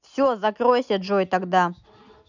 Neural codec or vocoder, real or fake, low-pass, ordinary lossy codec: none; real; 7.2 kHz; none